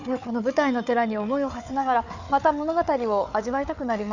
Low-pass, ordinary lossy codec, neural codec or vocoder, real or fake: 7.2 kHz; none; codec, 16 kHz, 4 kbps, FunCodec, trained on Chinese and English, 50 frames a second; fake